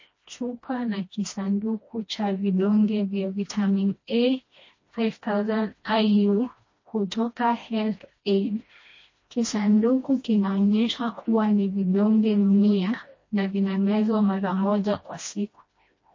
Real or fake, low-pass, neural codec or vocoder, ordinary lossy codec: fake; 7.2 kHz; codec, 16 kHz, 1 kbps, FreqCodec, smaller model; MP3, 32 kbps